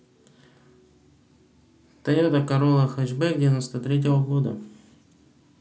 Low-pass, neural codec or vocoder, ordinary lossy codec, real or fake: none; none; none; real